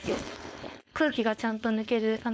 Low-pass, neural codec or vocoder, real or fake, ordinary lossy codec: none; codec, 16 kHz, 4.8 kbps, FACodec; fake; none